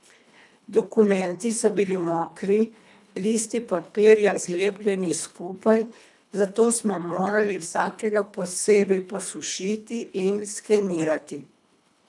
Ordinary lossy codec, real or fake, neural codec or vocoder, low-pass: none; fake; codec, 24 kHz, 1.5 kbps, HILCodec; none